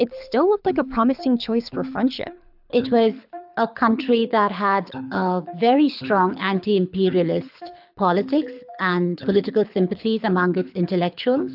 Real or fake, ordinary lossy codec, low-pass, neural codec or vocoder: fake; AAC, 48 kbps; 5.4 kHz; codec, 24 kHz, 6 kbps, HILCodec